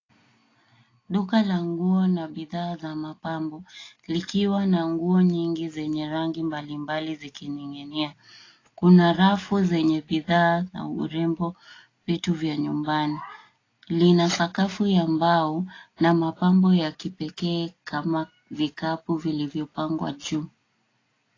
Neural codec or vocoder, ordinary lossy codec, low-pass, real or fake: none; AAC, 32 kbps; 7.2 kHz; real